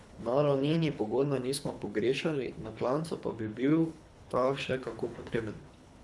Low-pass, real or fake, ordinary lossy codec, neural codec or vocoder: none; fake; none; codec, 24 kHz, 3 kbps, HILCodec